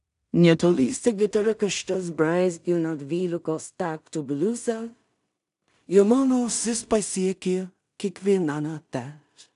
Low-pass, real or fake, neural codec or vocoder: 10.8 kHz; fake; codec, 16 kHz in and 24 kHz out, 0.4 kbps, LongCat-Audio-Codec, two codebook decoder